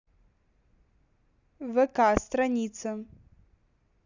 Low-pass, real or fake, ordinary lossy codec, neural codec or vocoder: 7.2 kHz; real; Opus, 64 kbps; none